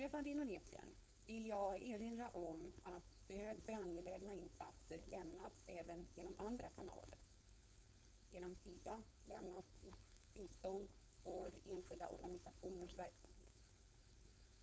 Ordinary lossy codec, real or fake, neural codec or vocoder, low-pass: none; fake; codec, 16 kHz, 4.8 kbps, FACodec; none